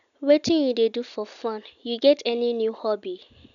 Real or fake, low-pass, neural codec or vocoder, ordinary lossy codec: real; 7.2 kHz; none; none